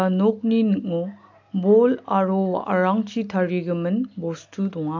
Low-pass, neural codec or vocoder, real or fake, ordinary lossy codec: 7.2 kHz; none; real; MP3, 64 kbps